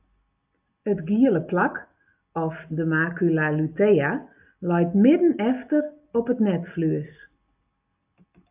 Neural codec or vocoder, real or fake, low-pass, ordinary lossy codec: none; real; 3.6 kHz; AAC, 32 kbps